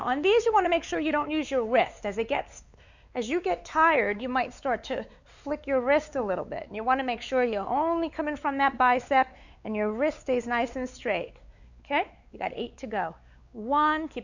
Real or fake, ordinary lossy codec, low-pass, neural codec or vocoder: fake; Opus, 64 kbps; 7.2 kHz; codec, 16 kHz, 4 kbps, X-Codec, WavLM features, trained on Multilingual LibriSpeech